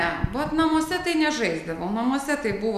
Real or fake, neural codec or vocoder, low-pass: real; none; 14.4 kHz